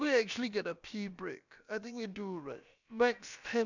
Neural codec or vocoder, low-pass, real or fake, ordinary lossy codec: codec, 16 kHz, about 1 kbps, DyCAST, with the encoder's durations; 7.2 kHz; fake; none